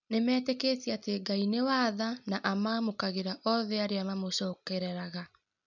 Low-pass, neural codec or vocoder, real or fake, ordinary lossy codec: 7.2 kHz; none; real; none